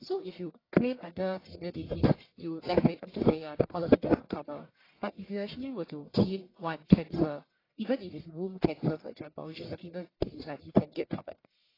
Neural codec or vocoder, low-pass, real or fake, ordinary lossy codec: codec, 44.1 kHz, 1.7 kbps, Pupu-Codec; 5.4 kHz; fake; AAC, 24 kbps